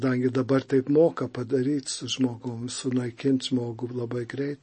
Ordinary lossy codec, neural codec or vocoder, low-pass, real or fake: MP3, 32 kbps; none; 10.8 kHz; real